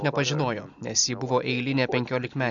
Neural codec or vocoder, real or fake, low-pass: none; real; 7.2 kHz